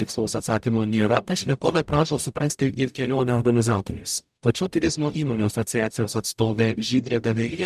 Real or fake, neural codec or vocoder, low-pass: fake; codec, 44.1 kHz, 0.9 kbps, DAC; 14.4 kHz